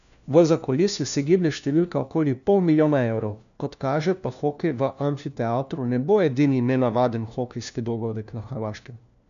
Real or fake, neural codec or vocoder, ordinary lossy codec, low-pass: fake; codec, 16 kHz, 1 kbps, FunCodec, trained on LibriTTS, 50 frames a second; none; 7.2 kHz